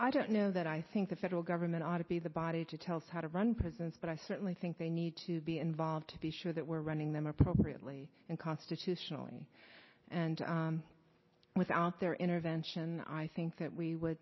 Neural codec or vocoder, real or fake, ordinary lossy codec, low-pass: none; real; MP3, 24 kbps; 7.2 kHz